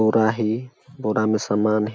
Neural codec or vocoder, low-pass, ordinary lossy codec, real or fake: none; none; none; real